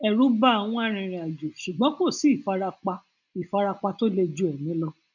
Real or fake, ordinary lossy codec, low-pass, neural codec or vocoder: real; none; 7.2 kHz; none